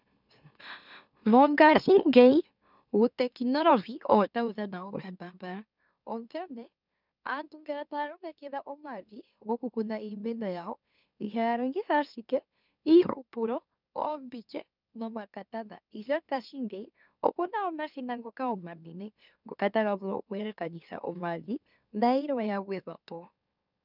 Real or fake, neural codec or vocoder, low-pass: fake; autoencoder, 44.1 kHz, a latent of 192 numbers a frame, MeloTTS; 5.4 kHz